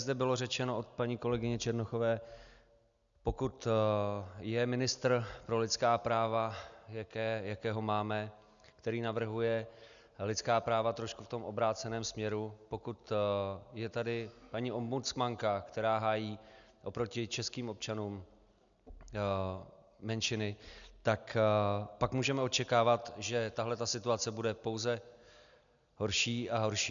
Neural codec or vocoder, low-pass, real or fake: none; 7.2 kHz; real